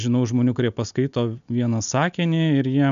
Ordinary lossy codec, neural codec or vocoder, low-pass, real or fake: MP3, 96 kbps; none; 7.2 kHz; real